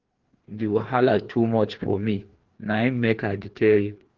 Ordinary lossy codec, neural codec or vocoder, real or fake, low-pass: Opus, 16 kbps; codec, 16 kHz, 2 kbps, FreqCodec, larger model; fake; 7.2 kHz